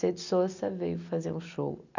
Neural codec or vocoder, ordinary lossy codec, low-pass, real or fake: none; none; 7.2 kHz; real